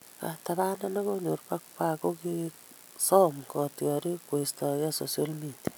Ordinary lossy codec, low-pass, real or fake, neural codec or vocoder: none; none; real; none